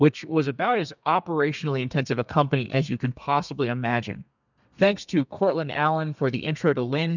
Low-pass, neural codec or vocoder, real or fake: 7.2 kHz; codec, 32 kHz, 1.9 kbps, SNAC; fake